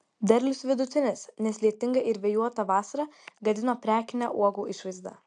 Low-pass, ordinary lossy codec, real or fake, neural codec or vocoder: 9.9 kHz; AAC, 64 kbps; real; none